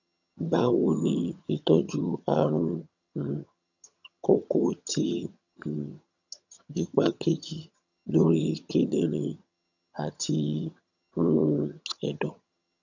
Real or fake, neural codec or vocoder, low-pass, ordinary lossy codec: fake; vocoder, 22.05 kHz, 80 mel bands, HiFi-GAN; 7.2 kHz; none